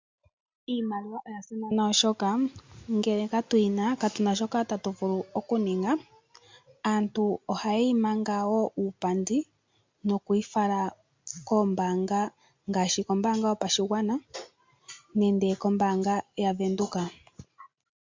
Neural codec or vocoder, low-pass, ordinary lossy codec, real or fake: none; 7.2 kHz; MP3, 64 kbps; real